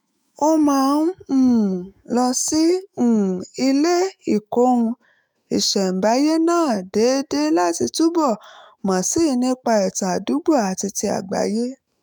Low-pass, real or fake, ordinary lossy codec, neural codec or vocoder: none; fake; none; autoencoder, 48 kHz, 128 numbers a frame, DAC-VAE, trained on Japanese speech